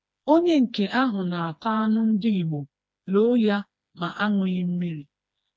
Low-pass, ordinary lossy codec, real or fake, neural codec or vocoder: none; none; fake; codec, 16 kHz, 2 kbps, FreqCodec, smaller model